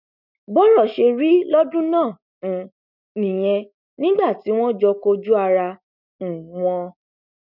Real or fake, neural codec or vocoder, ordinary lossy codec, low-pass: real; none; none; 5.4 kHz